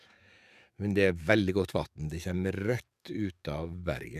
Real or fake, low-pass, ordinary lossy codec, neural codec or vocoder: fake; 14.4 kHz; none; codec, 44.1 kHz, 7.8 kbps, DAC